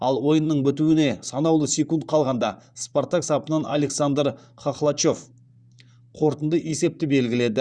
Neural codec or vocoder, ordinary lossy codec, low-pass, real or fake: vocoder, 44.1 kHz, 128 mel bands, Pupu-Vocoder; Opus, 64 kbps; 9.9 kHz; fake